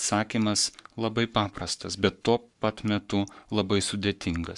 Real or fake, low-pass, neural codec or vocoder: fake; 10.8 kHz; codec, 44.1 kHz, 7.8 kbps, Pupu-Codec